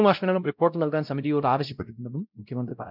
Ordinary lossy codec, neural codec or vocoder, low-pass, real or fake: none; codec, 16 kHz, 0.5 kbps, X-Codec, WavLM features, trained on Multilingual LibriSpeech; 5.4 kHz; fake